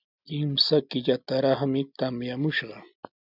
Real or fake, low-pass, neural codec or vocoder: real; 5.4 kHz; none